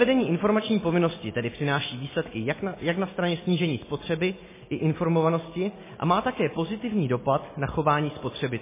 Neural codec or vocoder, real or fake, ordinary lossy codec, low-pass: none; real; MP3, 16 kbps; 3.6 kHz